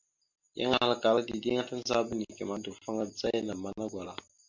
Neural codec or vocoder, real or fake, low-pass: none; real; 7.2 kHz